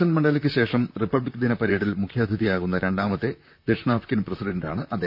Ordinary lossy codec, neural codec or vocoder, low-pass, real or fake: none; vocoder, 44.1 kHz, 128 mel bands, Pupu-Vocoder; 5.4 kHz; fake